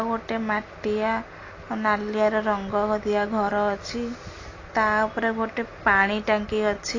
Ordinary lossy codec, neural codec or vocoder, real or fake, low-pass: AAC, 32 kbps; none; real; 7.2 kHz